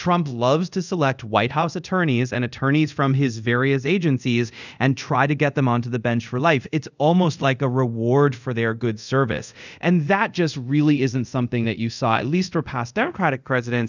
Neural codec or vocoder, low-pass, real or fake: codec, 24 kHz, 0.5 kbps, DualCodec; 7.2 kHz; fake